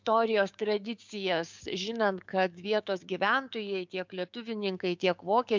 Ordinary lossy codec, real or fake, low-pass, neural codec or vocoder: MP3, 64 kbps; fake; 7.2 kHz; codec, 16 kHz, 6 kbps, DAC